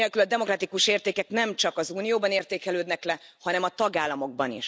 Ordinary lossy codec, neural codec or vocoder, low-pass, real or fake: none; none; none; real